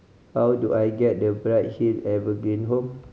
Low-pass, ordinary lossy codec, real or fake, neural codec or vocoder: none; none; real; none